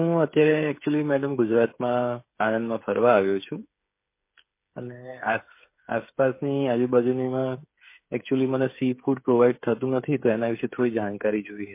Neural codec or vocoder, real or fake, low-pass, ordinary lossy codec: codec, 16 kHz, 8 kbps, FreqCodec, smaller model; fake; 3.6 kHz; MP3, 24 kbps